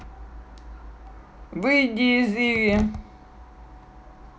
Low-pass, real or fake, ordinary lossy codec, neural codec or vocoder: none; real; none; none